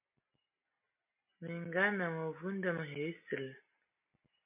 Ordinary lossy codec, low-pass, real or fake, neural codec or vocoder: MP3, 32 kbps; 3.6 kHz; real; none